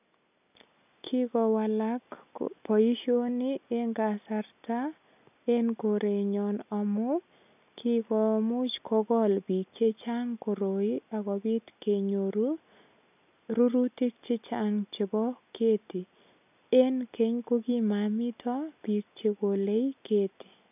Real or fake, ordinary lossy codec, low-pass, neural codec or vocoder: real; none; 3.6 kHz; none